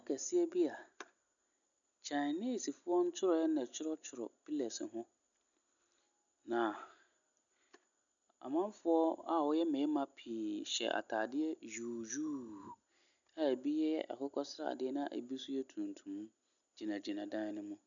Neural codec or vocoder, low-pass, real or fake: none; 7.2 kHz; real